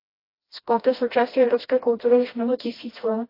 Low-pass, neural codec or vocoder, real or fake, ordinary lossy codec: 5.4 kHz; codec, 16 kHz, 1 kbps, FreqCodec, smaller model; fake; AAC, 24 kbps